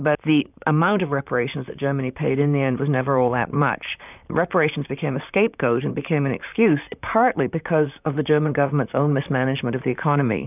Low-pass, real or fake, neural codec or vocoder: 3.6 kHz; fake; vocoder, 44.1 kHz, 128 mel bands, Pupu-Vocoder